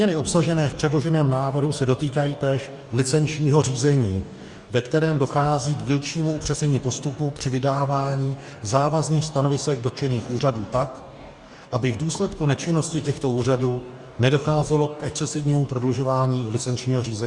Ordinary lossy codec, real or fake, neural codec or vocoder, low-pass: Opus, 64 kbps; fake; codec, 44.1 kHz, 2.6 kbps, DAC; 10.8 kHz